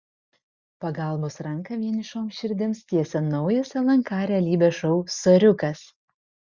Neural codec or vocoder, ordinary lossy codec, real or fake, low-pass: none; Opus, 64 kbps; real; 7.2 kHz